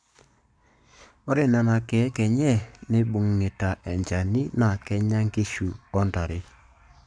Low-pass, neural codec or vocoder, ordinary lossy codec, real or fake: 9.9 kHz; codec, 44.1 kHz, 7.8 kbps, Pupu-Codec; none; fake